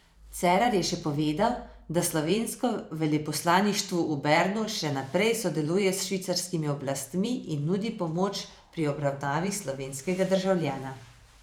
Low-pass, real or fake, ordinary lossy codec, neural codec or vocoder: none; fake; none; vocoder, 44.1 kHz, 128 mel bands every 512 samples, BigVGAN v2